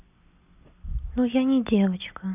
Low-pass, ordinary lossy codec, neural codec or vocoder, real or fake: 3.6 kHz; none; none; real